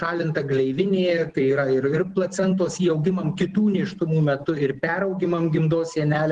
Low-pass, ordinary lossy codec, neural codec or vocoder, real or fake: 10.8 kHz; Opus, 16 kbps; none; real